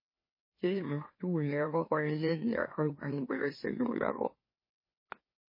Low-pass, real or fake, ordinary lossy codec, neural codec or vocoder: 5.4 kHz; fake; MP3, 24 kbps; autoencoder, 44.1 kHz, a latent of 192 numbers a frame, MeloTTS